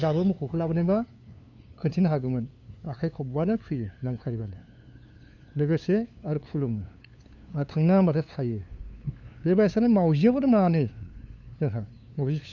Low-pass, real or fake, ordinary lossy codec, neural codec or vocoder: 7.2 kHz; fake; none; codec, 16 kHz, 2 kbps, FunCodec, trained on LibriTTS, 25 frames a second